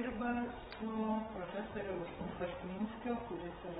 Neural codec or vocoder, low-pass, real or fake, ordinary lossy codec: codec, 16 kHz, 8 kbps, FreqCodec, larger model; 7.2 kHz; fake; AAC, 16 kbps